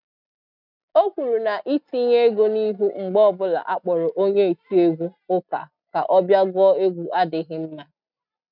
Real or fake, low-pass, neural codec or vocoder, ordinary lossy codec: real; 5.4 kHz; none; none